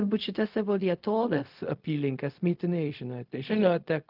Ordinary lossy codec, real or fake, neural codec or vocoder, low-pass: Opus, 24 kbps; fake; codec, 16 kHz, 0.4 kbps, LongCat-Audio-Codec; 5.4 kHz